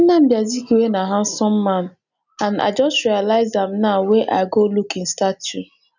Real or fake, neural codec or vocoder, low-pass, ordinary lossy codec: real; none; 7.2 kHz; none